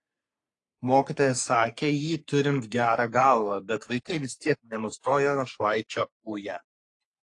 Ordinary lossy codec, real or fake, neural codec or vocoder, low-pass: AAC, 48 kbps; fake; codec, 44.1 kHz, 3.4 kbps, Pupu-Codec; 10.8 kHz